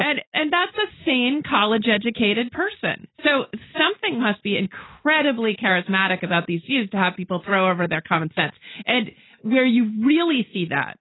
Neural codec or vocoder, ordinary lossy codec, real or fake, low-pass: none; AAC, 16 kbps; real; 7.2 kHz